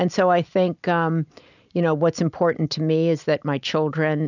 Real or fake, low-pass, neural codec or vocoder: real; 7.2 kHz; none